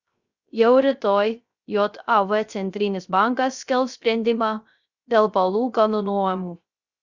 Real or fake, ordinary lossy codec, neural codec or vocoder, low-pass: fake; Opus, 64 kbps; codec, 16 kHz, 0.3 kbps, FocalCodec; 7.2 kHz